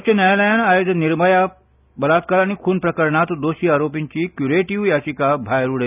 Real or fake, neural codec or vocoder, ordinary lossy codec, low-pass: real; none; none; 3.6 kHz